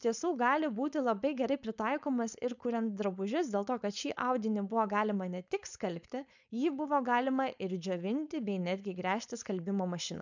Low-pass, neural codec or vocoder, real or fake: 7.2 kHz; codec, 16 kHz, 4.8 kbps, FACodec; fake